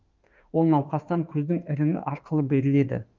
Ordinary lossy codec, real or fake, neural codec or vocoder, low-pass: Opus, 32 kbps; fake; autoencoder, 48 kHz, 32 numbers a frame, DAC-VAE, trained on Japanese speech; 7.2 kHz